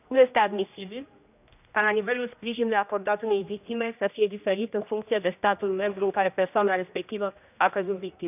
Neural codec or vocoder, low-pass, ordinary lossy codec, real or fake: codec, 16 kHz, 1 kbps, X-Codec, HuBERT features, trained on general audio; 3.6 kHz; none; fake